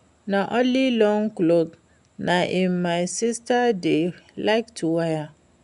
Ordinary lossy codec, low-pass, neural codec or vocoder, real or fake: none; 10.8 kHz; none; real